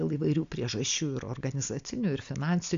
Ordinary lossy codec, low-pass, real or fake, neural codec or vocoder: MP3, 64 kbps; 7.2 kHz; real; none